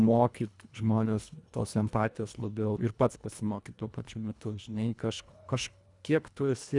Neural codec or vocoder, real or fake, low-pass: codec, 24 kHz, 1.5 kbps, HILCodec; fake; 10.8 kHz